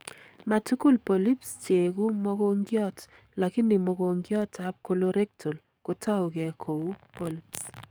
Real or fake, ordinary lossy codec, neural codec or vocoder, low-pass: fake; none; codec, 44.1 kHz, 7.8 kbps, DAC; none